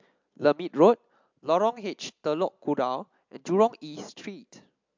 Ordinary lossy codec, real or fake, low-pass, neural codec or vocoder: MP3, 64 kbps; real; 7.2 kHz; none